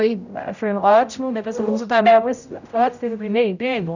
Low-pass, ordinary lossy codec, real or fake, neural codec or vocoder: 7.2 kHz; none; fake; codec, 16 kHz, 0.5 kbps, X-Codec, HuBERT features, trained on general audio